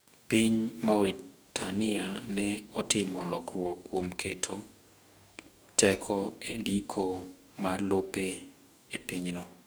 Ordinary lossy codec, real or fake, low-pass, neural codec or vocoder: none; fake; none; codec, 44.1 kHz, 2.6 kbps, DAC